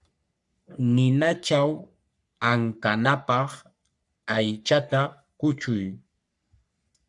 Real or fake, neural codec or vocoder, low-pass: fake; codec, 44.1 kHz, 3.4 kbps, Pupu-Codec; 10.8 kHz